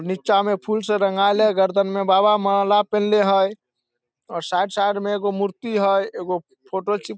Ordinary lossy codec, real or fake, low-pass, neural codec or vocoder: none; real; none; none